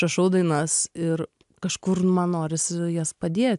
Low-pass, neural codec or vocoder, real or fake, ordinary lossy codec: 10.8 kHz; none; real; AAC, 96 kbps